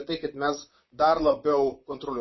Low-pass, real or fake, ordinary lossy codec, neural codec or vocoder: 7.2 kHz; fake; MP3, 24 kbps; vocoder, 44.1 kHz, 128 mel bands, Pupu-Vocoder